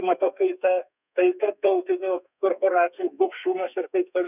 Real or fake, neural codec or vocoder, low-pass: fake; codec, 32 kHz, 1.9 kbps, SNAC; 3.6 kHz